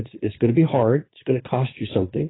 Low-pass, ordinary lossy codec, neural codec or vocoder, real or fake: 7.2 kHz; AAC, 16 kbps; vocoder, 22.05 kHz, 80 mel bands, Vocos; fake